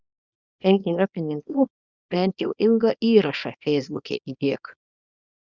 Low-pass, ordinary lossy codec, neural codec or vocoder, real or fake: 7.2 kHz; Opus, 64 kbps; codec, 24 kHz, 0.9 kbps, WavTokenizer, small release; fake